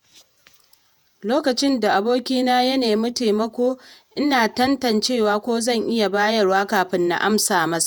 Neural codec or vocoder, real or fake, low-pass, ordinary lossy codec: vocoder, 48 kHz, 128 mel bands, Vocos; fake; none; none